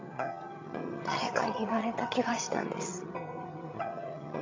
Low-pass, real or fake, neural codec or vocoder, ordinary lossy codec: 7.2 kHz; fake; vocoder, 22.05 kHz, 80 mel bands, HiFi-GAN; MP3, 48 kbps